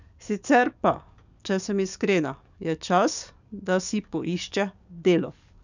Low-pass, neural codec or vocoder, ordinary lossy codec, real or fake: 7.2 kHz; codec, 44.1 kHz, 7.8 kbps, DAC; none; fake